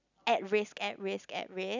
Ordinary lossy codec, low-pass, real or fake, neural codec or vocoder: none; 7.2 kHz; real; none